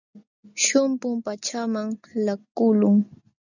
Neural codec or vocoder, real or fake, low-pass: none; real; 7.2 kHz